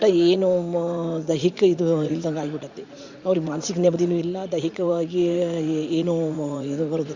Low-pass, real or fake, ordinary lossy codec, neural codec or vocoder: 7.2 kHz; fake; Opus, 64 kbps; vocoder, 44.1 kHz, 80 mel bands, Vocos